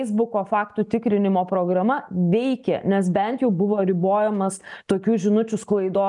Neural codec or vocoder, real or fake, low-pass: none; real; 10.8 kHz